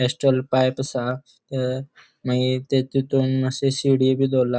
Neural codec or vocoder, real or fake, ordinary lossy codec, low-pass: none; real; none; none